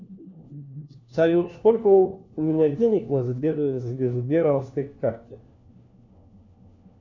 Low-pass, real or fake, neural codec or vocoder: 7.2 kHz; fake; codec, 16 kHz, 1 kbps, FunCodec, trained on LibriTTS, 50 frames a second